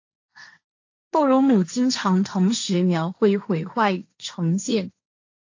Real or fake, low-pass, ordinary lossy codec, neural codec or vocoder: fake; 7.2 kHz; AAC, 48 kbps; codec, 16 kHz, 1.1 kbps, Voila-Tokenizer